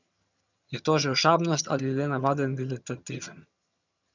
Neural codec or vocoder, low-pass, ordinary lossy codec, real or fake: vocoder, 22.05 kHz, 80 mel bands, HiFi-GAN; 7.2 kHz; none; fake